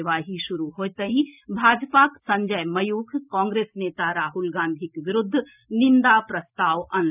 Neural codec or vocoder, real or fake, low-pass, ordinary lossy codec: none; real; 3.6 kHz; none